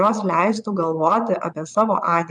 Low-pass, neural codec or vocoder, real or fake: 9.9 kHz; vocoder, 22.05 kHz, 80 mel bands, Vocos; fake